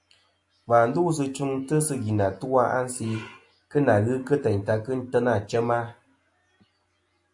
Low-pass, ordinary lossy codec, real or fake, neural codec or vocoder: 10.8 kHz; AAC, 64 kbps; real; none